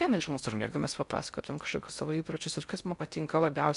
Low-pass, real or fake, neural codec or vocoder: 10.8 kHz; fake; codec, 16 kHz in and 24 kHz out, 0.6 kbps, FocalCodec, streaming, 4096 codes